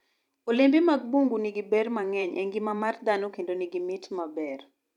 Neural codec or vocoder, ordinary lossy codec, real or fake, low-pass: vocoder, 44.1 kHz, 128 mel bands every 512 samples, BigVGAN v2; none; fake; 19.8 kHz